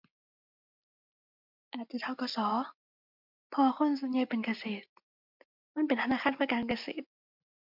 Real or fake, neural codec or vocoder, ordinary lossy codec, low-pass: real; none; MP3, 48 kbps; 5.4 kHz